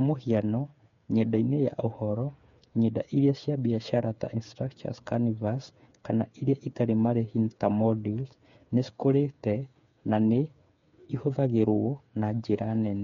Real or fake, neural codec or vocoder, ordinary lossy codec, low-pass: fake; codec, 16 kHz, 8 kbps, FreqCodec, smaller model; MP3, 48 kbps; 7.2 kHz